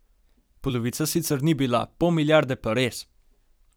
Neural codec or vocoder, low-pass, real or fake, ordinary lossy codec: vocoder, 44.1 kHz, 128 mel bands every 256 samples, BigVGAN v2; none; fake; none